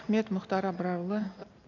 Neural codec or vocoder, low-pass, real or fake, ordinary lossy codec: none; 7.2 kHz; real; none